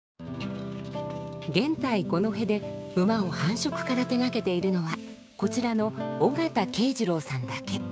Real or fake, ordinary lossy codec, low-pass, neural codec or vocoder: fake; none; none; codec, 16 kHz, 6 kbps, DAC